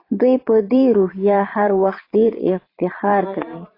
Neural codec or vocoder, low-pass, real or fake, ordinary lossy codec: vocoder, 22.05 kHz, 80 mel bands, Vocos; 5.4 kHz; fake; AAC, 24 kbps